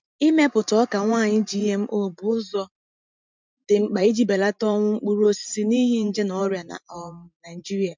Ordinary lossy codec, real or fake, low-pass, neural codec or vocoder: MP3, 64 kbps; fake; 7.2 kHz; vocoder, 44.1 kHz, 128 mel bands every 512 samples, BigVGAN v2